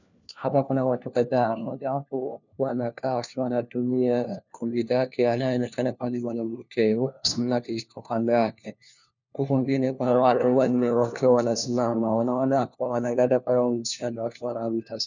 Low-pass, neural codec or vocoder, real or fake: 7.2 kHz; codec, 16 kHz, 1 kbps, FunCodec, trained on LibriTTS, 50 frames a second; fake